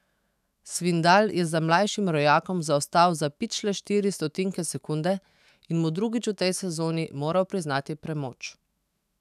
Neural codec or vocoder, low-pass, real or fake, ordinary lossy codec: autoencoder, 48 kHz, 128 numbers a frame, DAC-VAE, trained on Japanese speech; 14.4 kHz; fake; none